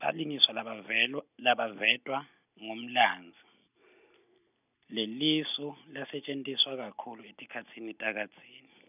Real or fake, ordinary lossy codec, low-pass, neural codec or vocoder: real; none; 3.6 kHz; none